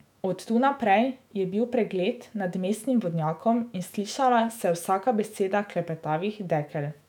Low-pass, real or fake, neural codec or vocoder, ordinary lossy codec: 19.8 kHz; fake; autoencoder, 48 kHz, 128 numbers a frame, DAC-VAE, trained on Japanese speech; none